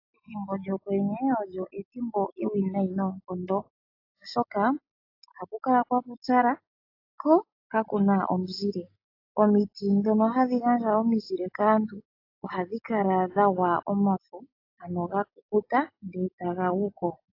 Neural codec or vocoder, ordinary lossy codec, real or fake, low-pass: none; AAC, 32 kbps; real; 5.4 kHz